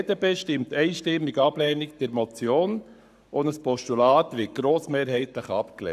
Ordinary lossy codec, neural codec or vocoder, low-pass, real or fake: none; codec, 44.1 kHz, 7.8 kbps, Pupu-Codec; 14.4 kHz; fake